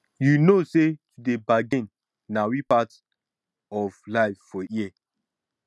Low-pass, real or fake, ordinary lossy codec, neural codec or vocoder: none; real; none; none